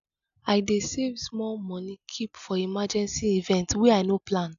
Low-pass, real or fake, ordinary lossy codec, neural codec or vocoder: 7.2 kHz; real; none; none